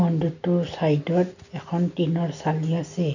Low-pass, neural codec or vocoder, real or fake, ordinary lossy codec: 7.2 kHz; none; real; none